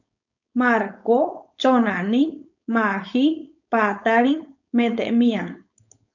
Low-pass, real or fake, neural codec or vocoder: 7.2 kHz; fake; codec, 16 kHz, 4.8 kbps, FACodec